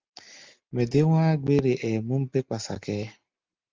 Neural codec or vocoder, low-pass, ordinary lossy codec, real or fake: none; 7.2 kHz; Opus, 24 kbps; real